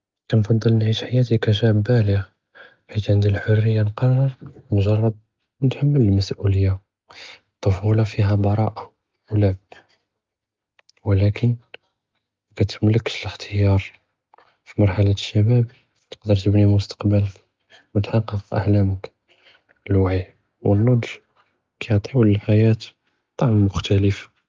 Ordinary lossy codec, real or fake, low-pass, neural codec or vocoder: Opus, 32 kbps; real; 7.2 kHz; none